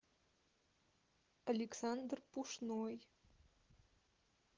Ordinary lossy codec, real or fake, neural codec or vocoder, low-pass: Opus, 16 kbps; real; none; 7.2 kHz